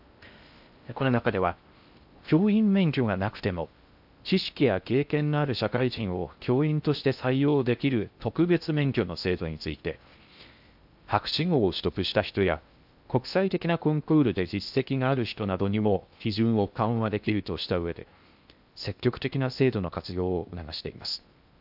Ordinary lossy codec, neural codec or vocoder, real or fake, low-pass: AAC, 48 kbps; codec, 16 kHz in and 24 kHz out, 0.6 kbps, FocalCodec, streaming, 4096 codes; fake; 5.4 kHz